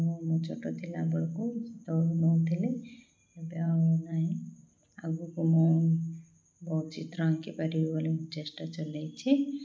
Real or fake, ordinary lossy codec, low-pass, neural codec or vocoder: real; none; none; none